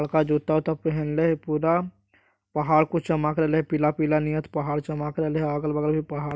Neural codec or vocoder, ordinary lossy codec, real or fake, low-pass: none; none; real; none